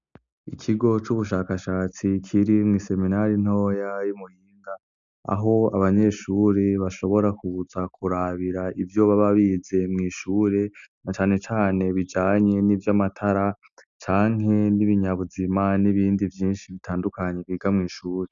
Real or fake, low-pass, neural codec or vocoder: real; 7.2 kHz; none